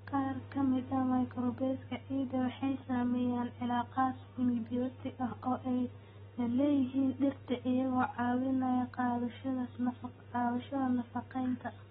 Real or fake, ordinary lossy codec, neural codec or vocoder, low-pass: real; AAC, 16 kbps; none; 10.8 kHz